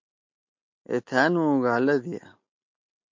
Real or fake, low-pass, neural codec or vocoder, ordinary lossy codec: real; 7.2 kHz; none; MP3, 48 kbps